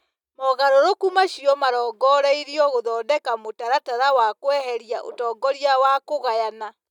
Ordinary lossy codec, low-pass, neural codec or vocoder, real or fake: none; 19.8 kHz; none; real